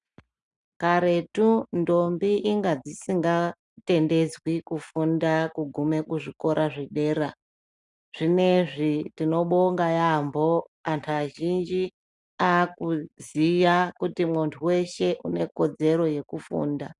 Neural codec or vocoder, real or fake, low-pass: none; real; 10.8 kHz